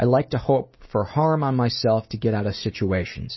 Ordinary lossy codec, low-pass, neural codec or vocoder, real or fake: MP3, 24 kbps; 7.2 kHz; none; real